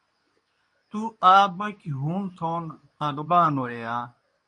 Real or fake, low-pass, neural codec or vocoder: fake; 10.8 kHz; codec, 24 kHz, 0.9 kbps, WavTokenizer, medium speech release version 2